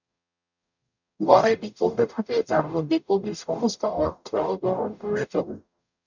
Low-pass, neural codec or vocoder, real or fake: 7.2 kHz; codec, 44.1 kHz, 0.9 kbps, DAC; fake